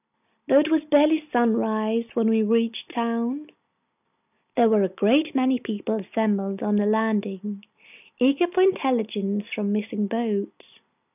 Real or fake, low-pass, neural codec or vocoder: real; 3.6 kHz; none